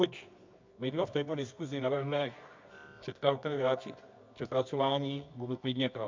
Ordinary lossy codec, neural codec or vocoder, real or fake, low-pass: MP3, 64 kbps; codec, 24 kHz, 0.9 kbps, WavTokenizer, medium music audio release; fake; 7.2 kHz